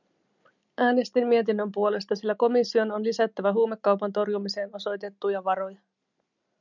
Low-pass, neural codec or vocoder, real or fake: 7.2 kHz; none; real